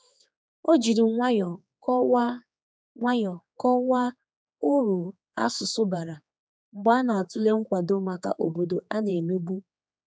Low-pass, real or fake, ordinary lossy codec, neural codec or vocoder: none; fake; none; codec, 16 kHz, 4 kbps, X-Codec, HuBERT features, trained on general audio